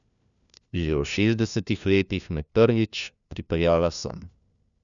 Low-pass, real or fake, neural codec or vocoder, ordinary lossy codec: 7.2 kHz; fake; codec, 16 kHz, 1 kbps, FunCodec, trained on LibriTTS, 50 frames a second; none